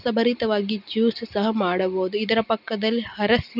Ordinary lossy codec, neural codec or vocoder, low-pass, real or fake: none; none; 5.4 kHz; real